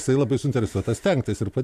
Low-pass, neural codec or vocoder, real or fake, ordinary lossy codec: 14.4 kHz; vocoder, 44.1 kHz, 128 mel bands every 512 samples, BigVGAN v2; fake; AAC, 96 kbps